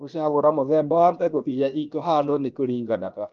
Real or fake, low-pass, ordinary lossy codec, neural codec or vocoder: fake; 7.2 kHz; Opus, 32 kbps; codec, 16 kHz, 0.7 kbps, FocalCodec